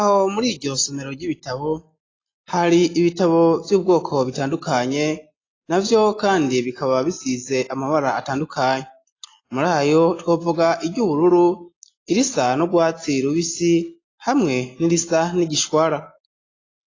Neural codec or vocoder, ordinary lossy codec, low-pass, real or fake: none; AAC, 32 kbps; 7.2 kHz; real